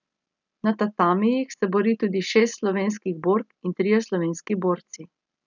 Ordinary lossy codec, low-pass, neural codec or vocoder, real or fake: none; 7.2 kHz; none; real